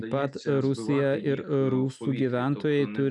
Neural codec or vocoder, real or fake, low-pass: none; real; 10.8 kHz